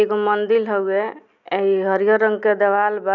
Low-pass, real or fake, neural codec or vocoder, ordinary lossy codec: 7.2 kHz; real; none; none